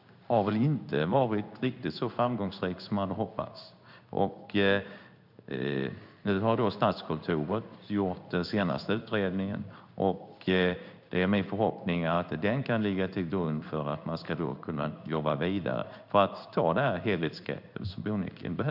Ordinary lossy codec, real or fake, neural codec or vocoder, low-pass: none; fake; codec, 16 kHz in and 24 kHz out, 1 kbps, XY-Tokenizer; 5.4 kHz